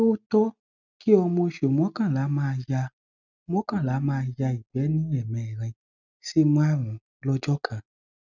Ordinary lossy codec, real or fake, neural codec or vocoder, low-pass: none; real; none; 7.2 kHz